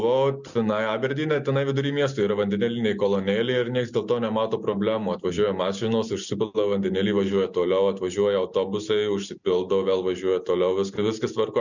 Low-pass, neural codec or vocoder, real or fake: 7.2 kHz; none; real